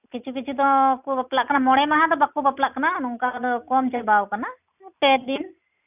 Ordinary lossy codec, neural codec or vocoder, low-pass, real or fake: none; none; 3.6 kHz; real